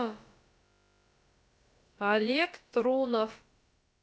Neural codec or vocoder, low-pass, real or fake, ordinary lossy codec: codec, 16 kHz, about 1 kbps, DyCAST, with the encoder's durations; none; fake; none